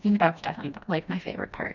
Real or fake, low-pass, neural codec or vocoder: fake; 7.2 kHz; codec, 16 kHz, 1 kbps, FreqCodec, smaller model